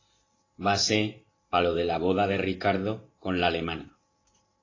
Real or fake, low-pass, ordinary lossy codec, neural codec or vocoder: fake; 7.2 kHz; AAC, 32 kbps; vocoder, 44.1 kHz, 128 mel bands every 512 samples, BigVGAN v2